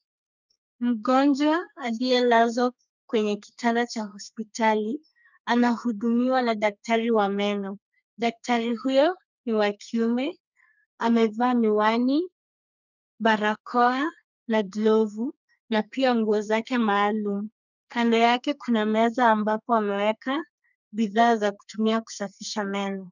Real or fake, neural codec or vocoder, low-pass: fake; codec, 32 kHz, 1.9 kbps, SNAC; 7.2 kHz